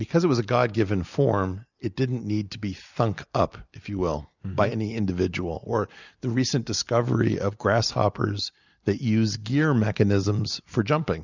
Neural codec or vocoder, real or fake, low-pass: none; real; 7.2 kHz